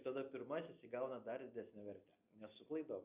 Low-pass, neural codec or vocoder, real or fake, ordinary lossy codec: 3.6 kHz; none; real; Opus, 64 kbps